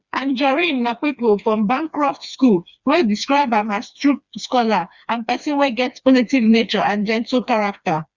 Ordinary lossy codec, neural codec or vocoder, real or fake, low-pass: Opus, 64 kbps; codec, 16 kHz, 2 kbps, FreqCodec, smaller model; fake; 7.2 kHz